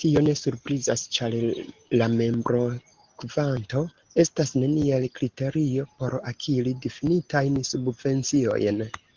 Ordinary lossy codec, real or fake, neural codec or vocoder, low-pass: Opus, 16 kbps; real; none; 7.2 kHz